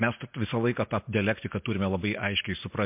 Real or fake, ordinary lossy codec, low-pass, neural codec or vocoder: real; MP3, 32 kbps; 3.6 kHz; none